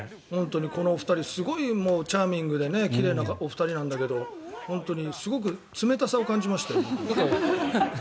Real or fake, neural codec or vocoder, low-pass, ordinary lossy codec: real; none; none; none